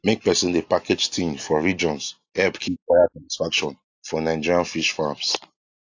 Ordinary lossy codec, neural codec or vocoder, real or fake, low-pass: AAC, 48 kbps; none; real; 7.2 kHz